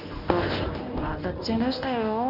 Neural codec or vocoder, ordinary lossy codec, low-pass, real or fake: codec, 24 kHz, 0.9 kbps, WavTokenizer, medium speech release version 1; none; 5.4 kHz; fake